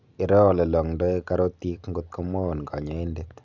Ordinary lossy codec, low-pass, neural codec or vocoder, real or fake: none; 7.2 kHz; none; real